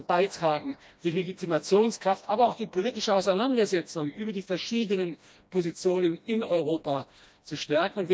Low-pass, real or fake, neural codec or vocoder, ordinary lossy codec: none; fake; codec, 16 kHz, 1 kbps, FreqCodec, smaller model; none